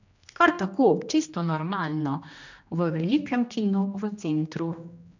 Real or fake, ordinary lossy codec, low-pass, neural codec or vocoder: fake; none; 7.2 kHz; codec, 16 kHz, 1 kbps, X-Codec, HuBERT features, trained on general audio